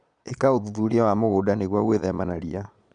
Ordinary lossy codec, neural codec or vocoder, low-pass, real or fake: none; vocoder, 22.05 kHz, 80 mel bands, Vocos; 9.9 kHz; fake